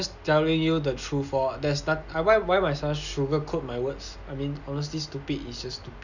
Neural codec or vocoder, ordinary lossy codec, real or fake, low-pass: none; none; real; 7.2 kHz